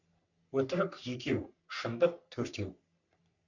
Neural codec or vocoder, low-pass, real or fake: codec, 44.1 kHz, 3.4 kbps, Pupu-Codec; 7.2 kHz; fake